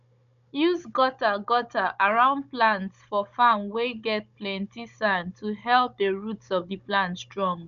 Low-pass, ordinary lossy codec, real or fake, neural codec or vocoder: 7.2 kHz; none; fake; codec, 16 kHz, 16 kbps, FunCodec, trained on Chinese and English, 50 frames a second